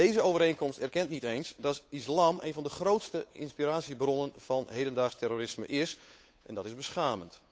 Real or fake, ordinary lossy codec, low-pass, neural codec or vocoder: fake; none; none; codec, 16 kHz, 8 kbps, FunCodec, trained on Chinese and English, 25 frames a second